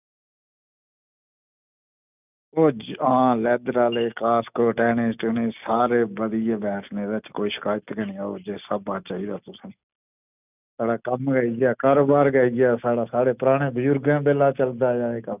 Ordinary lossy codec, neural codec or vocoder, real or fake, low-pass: none; none; real; 3.6 kHz